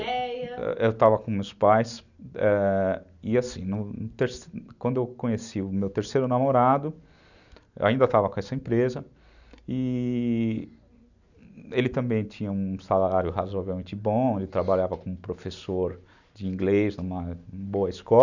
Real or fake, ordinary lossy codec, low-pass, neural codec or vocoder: real; none; 7.2 kHz; none